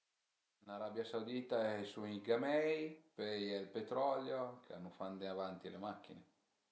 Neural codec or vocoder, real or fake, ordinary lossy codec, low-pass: none; real; none; none